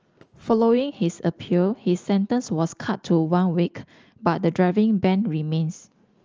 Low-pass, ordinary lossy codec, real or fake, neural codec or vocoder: 7.2 kHz; Opus, 24 kbps; real; none